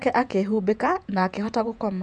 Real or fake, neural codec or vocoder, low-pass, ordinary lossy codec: fake; vocoder, 48 kHz, 128 mel bands, Vocos; 10.8 kHz; none